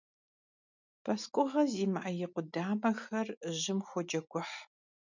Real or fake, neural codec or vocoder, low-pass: real; none; 7.2 kHz